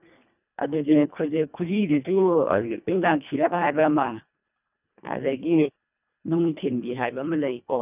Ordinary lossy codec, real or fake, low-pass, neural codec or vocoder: none; fake; 3.6 kHz; codec, 24 kHz, 1.5 kbps, HILCodec